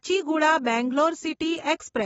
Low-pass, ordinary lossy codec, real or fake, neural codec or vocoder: 19.8 kHz; AAC, 24 kbps; real; none